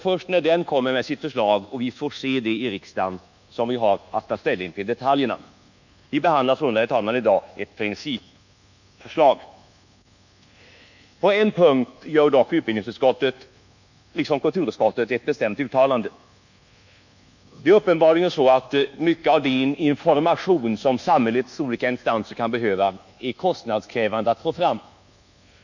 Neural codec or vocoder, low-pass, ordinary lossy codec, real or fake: codec, 24 kHz, 1.2 kbps, DualCodec; 7.2 kHz; none; fake